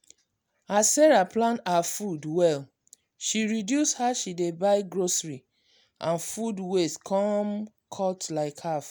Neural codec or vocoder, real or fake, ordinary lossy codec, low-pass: none; real; none; none